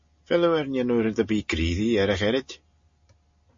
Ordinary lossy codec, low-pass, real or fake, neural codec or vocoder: MP3, 32 kbps; 7.2 kHz; real; none